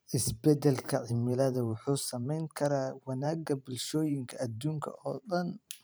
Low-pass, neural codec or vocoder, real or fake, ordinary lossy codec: none; vocoder, 44.1 kHz, 128 mel bands every 512 samples, BigVGAN v2; fake; none